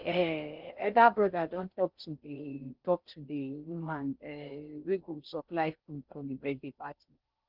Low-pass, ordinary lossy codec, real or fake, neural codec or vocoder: 5.4 kHz; Opus, 32 kbps; fake; codec, 16 kHz in and 24 kHz out, 0.6 kbps, FocalCodec, streaming, 4096 codes